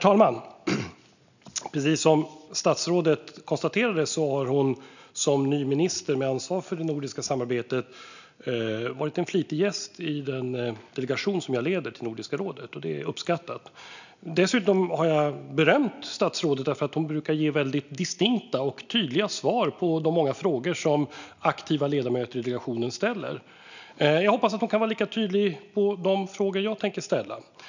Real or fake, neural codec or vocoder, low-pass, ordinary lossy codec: real; none; 7.2 kHz; none